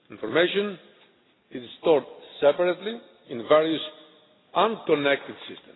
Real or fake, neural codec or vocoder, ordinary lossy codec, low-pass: real; none; AAC, 16 kbps; 7.2 kHz